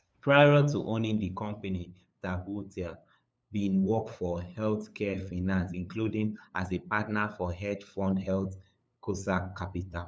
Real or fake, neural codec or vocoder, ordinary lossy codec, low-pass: fake; codec, 16 kHz, 8 kbps, FunCodec, trained on LibriTTS, 25 frames a second; none; none